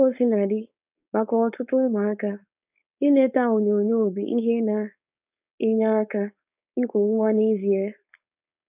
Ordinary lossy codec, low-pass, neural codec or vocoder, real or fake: none; 3.6 kHz; codec, 16 kHz, 4.8 kbps, FACodec; fake